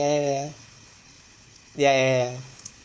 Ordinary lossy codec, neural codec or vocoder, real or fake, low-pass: none; codec, 16 kHz, 4 kbps, FunCodec, trained on Chinese and English, 50 frames a second; fake; none